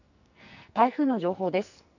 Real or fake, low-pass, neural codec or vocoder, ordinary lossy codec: fake; 7.2 kHz; codec, 44.1 kHz, 2.6 kbps, SNAC; none